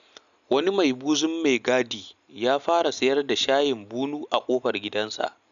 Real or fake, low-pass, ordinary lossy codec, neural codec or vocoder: real; 7.2 kHz; none; none